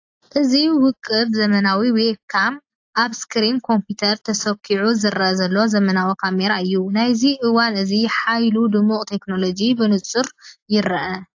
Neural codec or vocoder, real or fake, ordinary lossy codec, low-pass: none; real; AAC, 48 kbps; 7.2 kHz